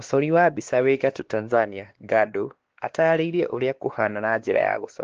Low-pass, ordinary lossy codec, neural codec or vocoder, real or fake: 7.2 kHz; Opus, 16 kbps; codec, 16 kHz, 2 kbps, X-Codec, WavLM features, trained on Multilingual LibriSpeech; fake